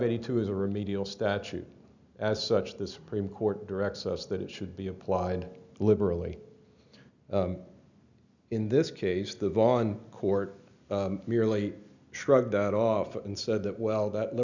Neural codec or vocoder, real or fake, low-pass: none; real; 7.2 kHz